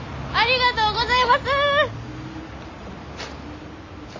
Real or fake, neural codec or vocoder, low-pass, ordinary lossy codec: real; none; 7.2 kHz; AAC, 32 kbps